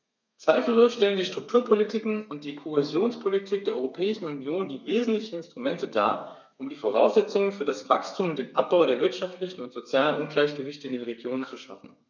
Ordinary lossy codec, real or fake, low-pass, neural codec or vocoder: none; fake; 7.2 kHz; codec, 32 kHz, 1.9 kbps, SNAC